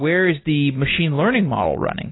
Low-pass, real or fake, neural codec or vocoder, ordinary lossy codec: 7.2 kHz; real; none; AAC, 16 kbps